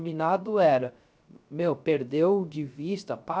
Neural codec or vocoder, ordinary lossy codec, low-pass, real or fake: codec, 16 kHz, 0.3 kbps, FocalCodec; none; none; fake